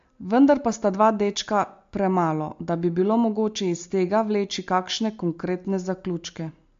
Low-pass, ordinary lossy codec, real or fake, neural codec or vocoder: 7.2 kHz; MP3, 48 kbps; real; none